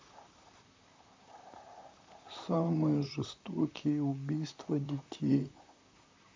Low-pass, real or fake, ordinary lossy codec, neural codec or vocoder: 7.2 kHz; fake; MP3, 64 kbps; vocoder, 44.1 kHz, 128 mel bands, Pupu-Vocoder